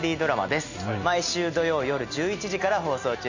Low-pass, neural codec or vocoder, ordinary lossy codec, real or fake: 7.2 kHz; none; none; real